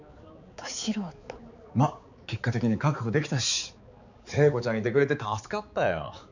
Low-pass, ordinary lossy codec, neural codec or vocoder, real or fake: 7.2 kHz; none; codec, 16 kHz, 4 kbps, X-Codec, HuBERT features, trained on balanced general audio; fake